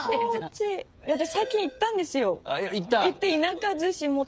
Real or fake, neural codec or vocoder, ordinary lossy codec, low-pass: fake; codec, 16 kHz, 8 kbps, FreqCodec, smaller model; none; none